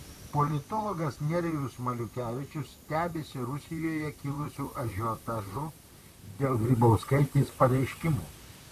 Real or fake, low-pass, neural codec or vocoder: fake; 14.4 kHz; vocoder, 44.1 kHz, 128 mel bands, Pupu-Vocoder